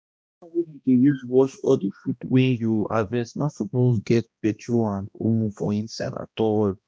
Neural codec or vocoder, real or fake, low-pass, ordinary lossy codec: codec, 16 kHz, 1 kbps, X-Codec, HuBERT features, trained on balanced general audio; fake; none; none